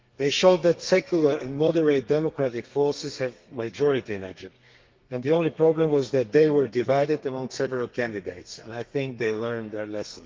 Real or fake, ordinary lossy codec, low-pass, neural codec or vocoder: fake; Opus, 32 kbps; 7.2 kHz; codec, 32 kHz, 1.9 kbps, SNAC